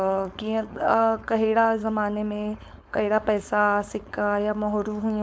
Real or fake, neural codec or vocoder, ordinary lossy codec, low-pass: fake; codec, 16 kHz, 4.8 kbps, FACodec; none; none